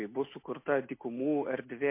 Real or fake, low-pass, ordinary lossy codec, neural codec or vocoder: real; 3.6 kHz; MP3, 24 kbps; none